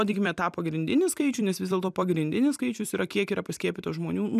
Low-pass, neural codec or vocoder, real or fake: 14.4 kHz; vocoder, 44.1 kHz, 128 mel bands every 256 samples, BigVGAN v2; fake